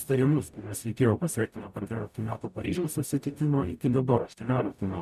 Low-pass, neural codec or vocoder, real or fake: 14.4 kHz; codec, 44.1 kHz, 0.9 kbps, DAC; fake